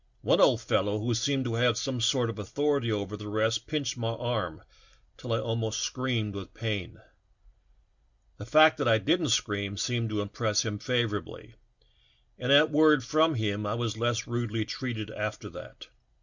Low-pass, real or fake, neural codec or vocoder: 7.2 kHz; real; none